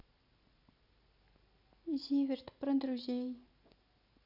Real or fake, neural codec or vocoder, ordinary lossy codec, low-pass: real; none; none; 5.4 kHz